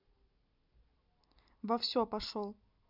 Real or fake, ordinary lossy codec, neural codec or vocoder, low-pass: real; none; none; 5.4 kHz